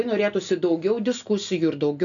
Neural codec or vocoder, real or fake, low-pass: none; real; 7.2 kHz